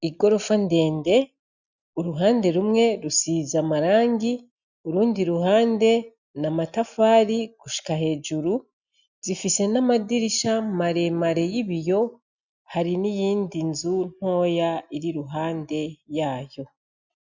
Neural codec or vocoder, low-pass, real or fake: none; 7.2 kHz; real